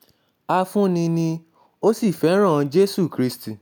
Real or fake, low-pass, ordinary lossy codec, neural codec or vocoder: real; none; none; none